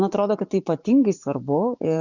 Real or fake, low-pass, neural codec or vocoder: fake; 7.2 kHz; vocoder, 24 kHz, 100 mel bands, Vocos